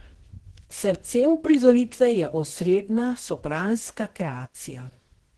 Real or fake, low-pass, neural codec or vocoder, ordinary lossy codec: fake; 10.8 kHz; codec, 24 kHz, 0.9 kbps, WavTokenizer, medium music audio release; Opus, 16 kbps